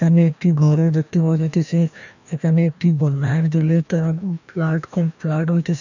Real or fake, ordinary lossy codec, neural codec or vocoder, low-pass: fake; none; codec, 16 kHz, 1 kbps, FreqCodec, larger model; 7.2 kHz